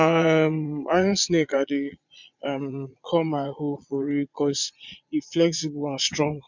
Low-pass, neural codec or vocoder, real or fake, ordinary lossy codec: 7.2 kHz; vocoder, 22.05 kHz, 80 mel bands, Vocos; fake; MP3, 64 kbps